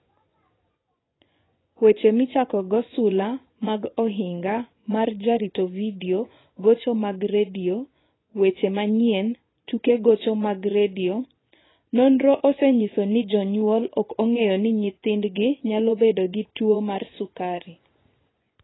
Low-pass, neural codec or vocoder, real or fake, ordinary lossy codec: 7.2 kHz; vocoder, 24 kHz, 100 mel bands, Vocos; fake; AAC, 16 kbps